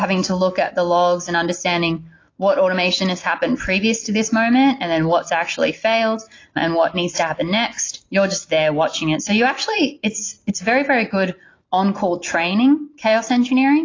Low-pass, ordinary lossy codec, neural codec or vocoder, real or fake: 7.2 kHz; AAC, 32 kbps; none; real